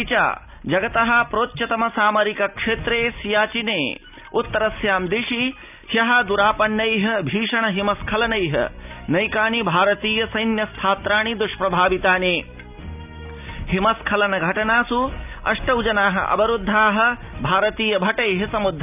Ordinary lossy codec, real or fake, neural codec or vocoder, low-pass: none; real; none; 3.6 kHz